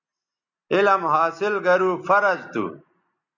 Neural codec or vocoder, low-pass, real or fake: none; 7.2 kHz; real